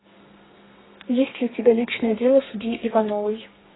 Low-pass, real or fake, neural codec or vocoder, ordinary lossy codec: 7.2 kHz; fake; codec, 32 kHz, 1.9 kbps, SNAC; AAC, 16 kbps